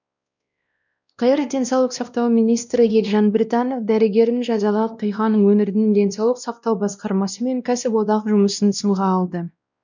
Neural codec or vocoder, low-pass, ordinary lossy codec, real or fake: codec, 16 kHz, 1 kbps, X-Codec, WavLM features, trained on Multilingual LibriSpeech; 7.2 kHz; none; fake